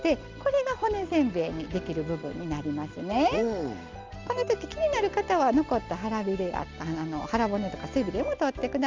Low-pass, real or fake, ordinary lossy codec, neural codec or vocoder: 7.2 kHz; real; Opus, 32 kbps; none